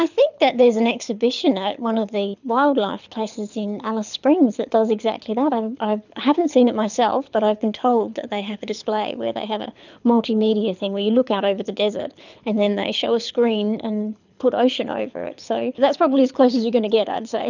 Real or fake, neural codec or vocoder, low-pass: fake; codec, 24 kHz, 6 kbps, HILCodec; 7.2 kHz